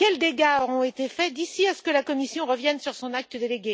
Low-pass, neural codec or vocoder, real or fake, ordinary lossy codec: none; none; real; none